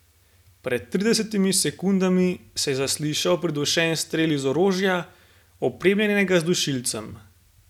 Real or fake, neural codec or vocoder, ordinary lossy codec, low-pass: real; none; none; 19.8 kHz